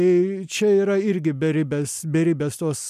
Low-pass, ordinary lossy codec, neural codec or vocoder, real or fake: 14.4 kHz; MP3, 96 kbps; none; real